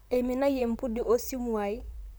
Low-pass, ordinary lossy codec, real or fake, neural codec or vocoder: none; none; fake; vocoder, 44.1 kHz, 128 mel bands, Pupu-Vocoder